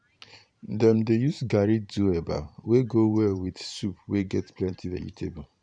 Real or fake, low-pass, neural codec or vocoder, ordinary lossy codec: fake; 9.9 kHz; vocoder, 44.1 kHz, 128 mel bands every 512 samples, BigVGAN v2; none